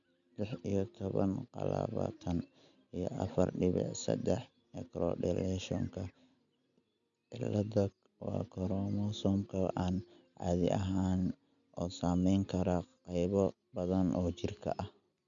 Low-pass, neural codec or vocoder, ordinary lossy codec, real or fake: 7.2 kHz; none; none; real